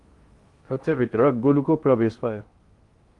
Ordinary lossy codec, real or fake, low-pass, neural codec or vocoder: Opus, 24 kbps; fake; 10.8 kHz; codec, 16 kHz in and 24 kHz out, 0.8 kbps, FocalCodec, streaming, 65536 codes